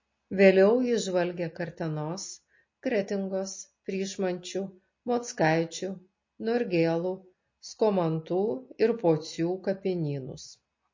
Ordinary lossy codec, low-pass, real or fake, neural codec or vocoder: MP3, 32 kbps; 7.2 kHz; real; none